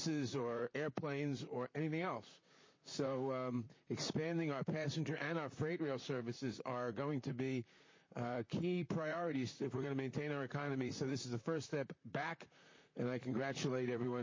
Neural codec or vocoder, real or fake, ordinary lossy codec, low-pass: vocoder, 44.1 kHz, 128 mel bands, Pupu-Vocoder; fake; MP3, 32 kbps; 7.2 kHz